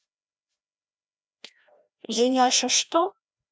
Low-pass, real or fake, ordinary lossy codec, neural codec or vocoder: none; fake; none; codec, 16 kHz, 1 kbps, FreqCodec, larger model